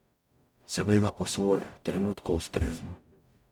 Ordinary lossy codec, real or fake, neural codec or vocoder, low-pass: none; fake; codec, 44.1 kHz, 0.9 kbps, DAC; 19.8 kHz